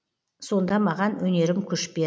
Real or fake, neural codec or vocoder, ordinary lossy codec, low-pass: real; none; none; none